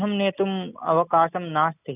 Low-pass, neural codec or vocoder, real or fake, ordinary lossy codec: 3.6 kHz; none; real; none